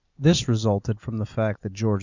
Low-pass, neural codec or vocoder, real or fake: 7.2 kHz; none; real